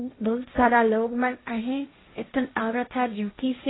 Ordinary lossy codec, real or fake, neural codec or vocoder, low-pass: AAC, 16 kbps; fake; codec, 16 kHz, 1.1 kbps, Voila-Tokenizer; 7.2 kHz